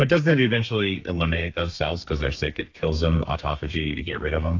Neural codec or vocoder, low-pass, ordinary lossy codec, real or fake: codec, 32 kHz, 1.9 kbps, SNAC; 7.2 kHz; AAC, 48 kbps; fake